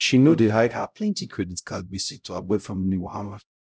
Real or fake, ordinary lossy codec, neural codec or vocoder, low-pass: fake; none; codec, 16 kHz, 0.5 kbps, X-Codec, HuBERT features, trained on LibriSpeech; none